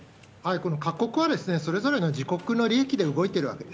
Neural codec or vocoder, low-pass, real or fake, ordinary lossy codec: none; none; real; none